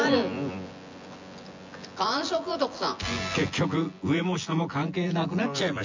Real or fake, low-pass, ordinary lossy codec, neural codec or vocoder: fake; 7.2 kHz; MP3, 64 kbps; vocoder, 24 kHz, 100 mel bands, Vocos